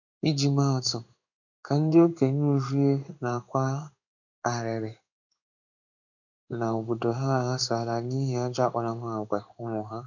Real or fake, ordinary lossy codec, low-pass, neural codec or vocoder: fake; none; 7.2 kHz; codec, 16 kHz in and 24 kHz out, 1 kbps, XY-Tokenizer